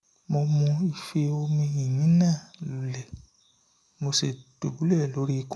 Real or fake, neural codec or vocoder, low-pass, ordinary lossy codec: real; none; none; none